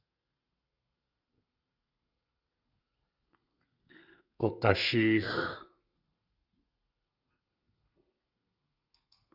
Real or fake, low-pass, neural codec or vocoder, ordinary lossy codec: fake; 5.4 kHz; codec, 32 kHz, 1.9 kbps, SNAC; Opus, 64 kbps